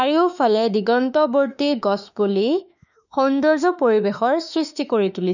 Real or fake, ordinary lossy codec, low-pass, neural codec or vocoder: fake; none; 7.2 kHz; autoencoder, 48 kHz, 32 numbers a frame, DAC-VAE, trained on Japanese speech